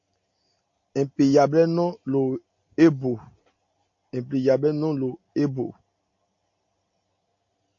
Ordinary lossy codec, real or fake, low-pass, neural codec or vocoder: AAC, 48 kbps; real; 7.2 kHz; none